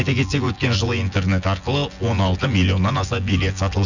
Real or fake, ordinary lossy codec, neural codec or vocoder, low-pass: fake; none; vocoder, 24 kHz, 100 mel bands, Vocos; 7.2 kHz